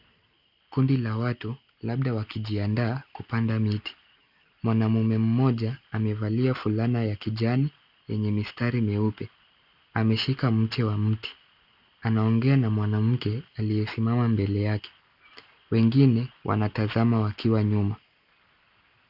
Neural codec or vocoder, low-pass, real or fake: none; 5.4 kHz; real